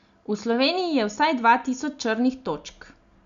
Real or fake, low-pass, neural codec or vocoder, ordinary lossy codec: real; 7.2 kHz; none; none